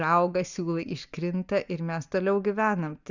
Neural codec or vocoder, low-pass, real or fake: none; 7.2 kHz; real